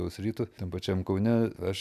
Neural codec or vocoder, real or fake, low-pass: none; real; 14.4 kHz